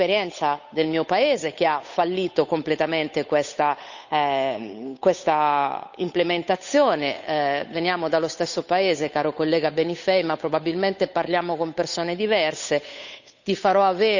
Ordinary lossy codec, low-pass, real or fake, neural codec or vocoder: none; 7.2 kHz; fake; codec, 16 kHz, 8 kbps, FunCodec, trained on Chinese and English, 25 frames a second